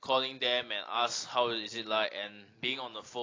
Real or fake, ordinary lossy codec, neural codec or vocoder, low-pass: real; AAC, 32 kbps; none; 7.2 kHz